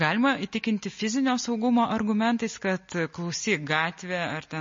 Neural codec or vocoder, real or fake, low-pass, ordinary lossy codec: none; real; 7.2 kHz; MP3, 32 kbps